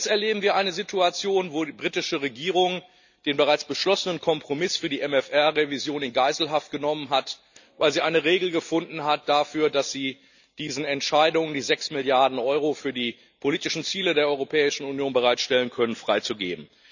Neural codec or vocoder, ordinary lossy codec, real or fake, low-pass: none; none; real; 7.2 kHz